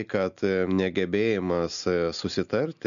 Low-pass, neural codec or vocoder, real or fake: 7.2 kHz; none; real